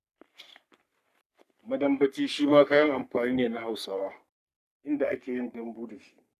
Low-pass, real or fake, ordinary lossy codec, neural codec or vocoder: 14.4 kHz; fake; none; codec, 44.1 kHz, 3.4 kbps, Pupu-Codec